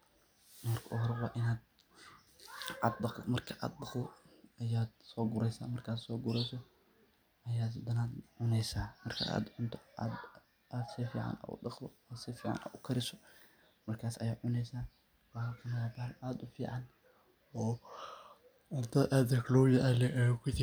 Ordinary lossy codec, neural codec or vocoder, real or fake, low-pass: none; none; real; none